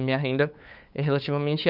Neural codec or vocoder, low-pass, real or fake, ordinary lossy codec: codec, 16 kHz, 8 kbps, FunCodec, trained on LibriTTS, 25 frames a second; 5.4 kHz; fake; none